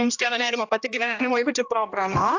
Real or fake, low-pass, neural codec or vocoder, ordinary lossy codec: fake; 7.2 kHz; codec, 16 kHz, 1 kbps, X-Codec, HuBERT features, trained on general audio; AAC, 48 kbps